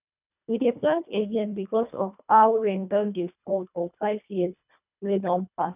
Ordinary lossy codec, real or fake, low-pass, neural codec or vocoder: none; fake; 3.6 kHz; codec, 24 kHz, 1.5 kbps, HILCodec